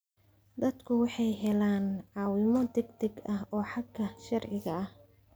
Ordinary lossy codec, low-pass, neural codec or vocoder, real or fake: none; none; none; real